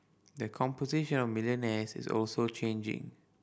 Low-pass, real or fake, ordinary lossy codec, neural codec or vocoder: none; real; none; none